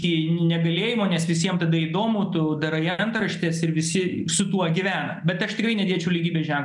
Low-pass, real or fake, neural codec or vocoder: 10.8 kHz; real; none